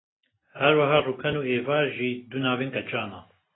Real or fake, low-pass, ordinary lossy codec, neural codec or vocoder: real; 7.2 kHz; AAC, 16 kbps; none